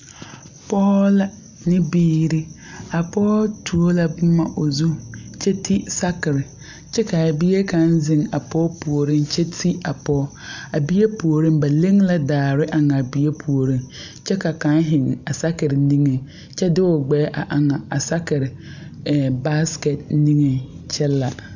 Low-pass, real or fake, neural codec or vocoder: 7.2 kHz; real; none